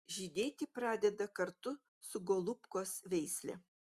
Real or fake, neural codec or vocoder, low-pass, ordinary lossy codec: real; none; 14.4 kHz; Opus, 64 kbps